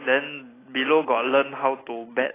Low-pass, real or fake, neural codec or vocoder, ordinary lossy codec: 3.6 kHz; real; none; AAC, 16 kbps